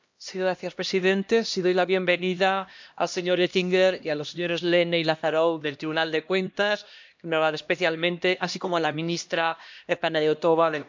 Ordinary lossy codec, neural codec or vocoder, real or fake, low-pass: MP3, 64 kbps; codec, 16 kHz, 1 kbps, X-Codec, HuBERT features, trained on LibriSpeech; fake; 7.2 kHz